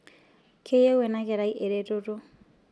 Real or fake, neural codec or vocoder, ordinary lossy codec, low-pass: real; none; none; none